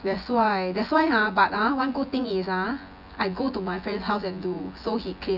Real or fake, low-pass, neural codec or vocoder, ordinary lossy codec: fake; 5.4 kHz; vocoder, 24 kHz, 100 mel bands, Vocos; none